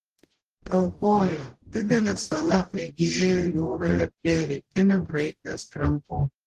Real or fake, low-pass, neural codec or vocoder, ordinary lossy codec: fake; 14.4 kHz; codec, 44.1 kHz, 0.9 kbps, DAC; Opus, 16 kbps